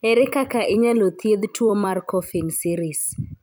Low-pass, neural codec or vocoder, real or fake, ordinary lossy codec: none; vocoder, 44.1 kHz, 128 mel bands every 256 samples, BigVGAN v2; fake; none